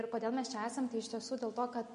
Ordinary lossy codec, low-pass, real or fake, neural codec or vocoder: MP3, 48 kbps; 10.8 kHz; real; none